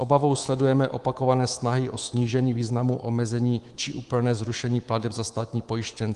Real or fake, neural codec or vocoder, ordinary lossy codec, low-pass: fake; codec, 24 kHz, 3.1 kbps, DualCodec; Opus, 24 kbps; 10.8 kHz